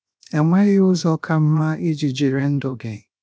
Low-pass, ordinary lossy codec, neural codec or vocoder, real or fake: none; none; codec, 16 kHz, 0.7 kbps, FocalCodec; fake